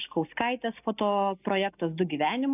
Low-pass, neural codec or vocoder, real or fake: 3.6 kHz; none; real